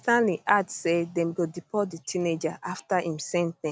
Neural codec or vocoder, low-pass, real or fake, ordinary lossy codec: none; none; real; none